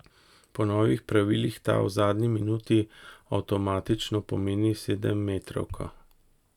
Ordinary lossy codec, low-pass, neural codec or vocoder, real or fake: none; 19.8 kHz; none; real